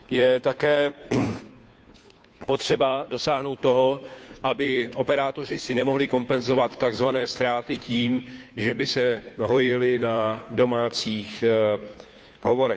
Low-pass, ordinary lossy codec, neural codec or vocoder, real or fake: none; none; codec, 16 kHz, 2 kbps, FunCodec, trained on Chinese and English, 25 frames a second; fake